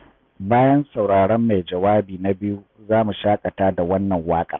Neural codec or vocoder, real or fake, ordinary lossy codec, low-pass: none; real; none; 7.2 kHz